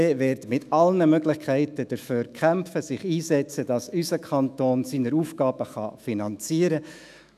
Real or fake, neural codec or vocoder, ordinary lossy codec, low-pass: fake; autoencoder, 48 kHz, 128 numbers a frame, DAC-VAE, trained on Japanese speech; none; 14.4 kHz